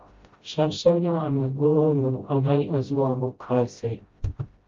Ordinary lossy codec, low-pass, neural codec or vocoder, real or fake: Opus, 32 kbps; 7.2 kHz; codec, 16 kHz, 0.5 kbps, FreqCodec, smaller model; fake